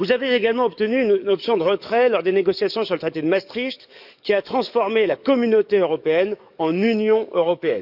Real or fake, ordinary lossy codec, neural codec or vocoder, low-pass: fake; none; codec, 44.1 kHz, 7.8 kbps, DAC; 5.4 kHz